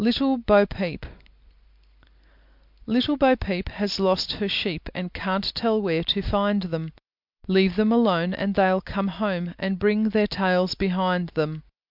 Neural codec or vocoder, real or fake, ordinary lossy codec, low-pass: none; real; MP3, 48 kbps; 5.4 kHz